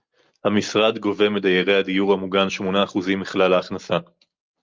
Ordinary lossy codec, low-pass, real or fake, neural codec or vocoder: Opus, 24 kbps; 7.2 kHz; real; none